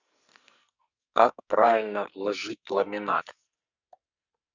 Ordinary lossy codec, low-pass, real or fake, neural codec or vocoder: Opus, 64 kbps; 7.2 kHz; fake; codec, 44.1 kHz, 2.6 kbps, SNAC